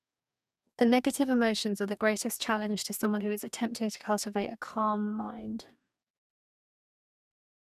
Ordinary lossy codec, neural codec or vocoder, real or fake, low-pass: none; codec, 44.1 kHz, 2.6 kbps, DAC; fake; 14.4 kHz